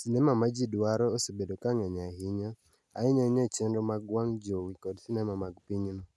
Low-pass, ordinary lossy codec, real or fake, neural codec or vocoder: none; none; real; none